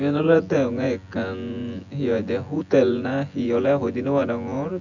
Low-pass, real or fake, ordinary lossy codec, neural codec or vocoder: 7.2 kHz; fake; none; vocoder, 24 kHz, 100 mel bands, Vocos